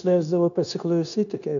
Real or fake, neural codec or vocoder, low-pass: fake; codec, 16 kHz, 0.9 kbps, LongCat-Audio-Codec; 7.2 kHz